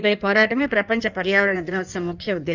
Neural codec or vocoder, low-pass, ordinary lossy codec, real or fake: codec, 16 kHz in and 24 kHz out, 1.1 kbps, FireRedTTS-2 codec; 7.2 kHz; none; fake